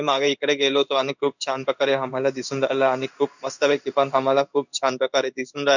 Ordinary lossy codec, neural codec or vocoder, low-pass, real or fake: none; codec, 16 kHz in and 24 kHz out, 1 kbps, XY-Tokenizer; 7.2 kHz; fake